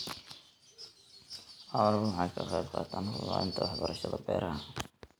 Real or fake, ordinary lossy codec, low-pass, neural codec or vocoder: real; none; none; none